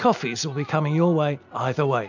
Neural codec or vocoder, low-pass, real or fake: none; 7.2 kHz; real